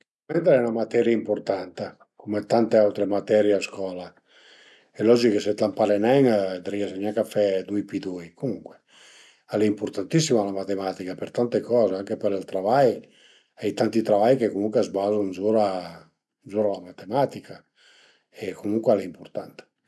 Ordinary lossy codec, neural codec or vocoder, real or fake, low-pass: none; none; real; none